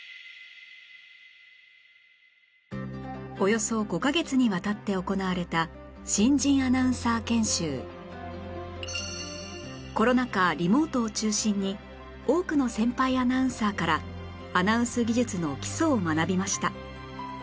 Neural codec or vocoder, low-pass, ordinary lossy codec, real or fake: none; none; none; real